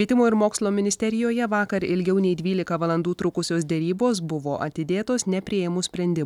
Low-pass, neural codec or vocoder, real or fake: 19.8 kHz; none; real